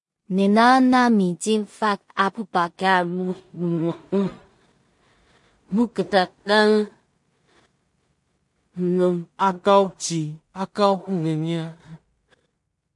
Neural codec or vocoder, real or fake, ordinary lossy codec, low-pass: codec, 16 kHz in and 24 kHz out, 0.4 kbps, LongCat-Audio-Codec, two codebook decoder; fake; MP3, 48 kbps; 10.8 kHz